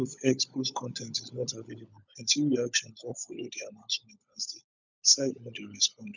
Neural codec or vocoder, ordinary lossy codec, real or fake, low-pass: codec, 16 kHz, 16 kbps, FunCodec, trained on LibriTTS, 50 frames a second; none; fake; 7.2 kHz